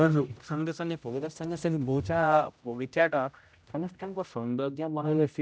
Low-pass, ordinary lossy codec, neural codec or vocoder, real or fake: none; none; codec, 16 kHz, 0.5 kbps, X-Codec, HuBERT features, trained on general audio; fake